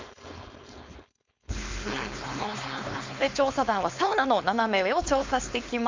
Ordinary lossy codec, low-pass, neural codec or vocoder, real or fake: MP3, 64 kbps; 7.2 kHz; codec, 16 kHz, 4.8 kbps, FACodec; fake